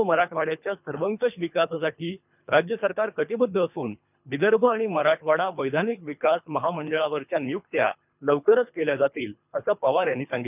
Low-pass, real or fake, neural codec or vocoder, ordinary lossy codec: 3.6 kHz; fake; codec, 24 kHz, 3 kbps, HILCodec; none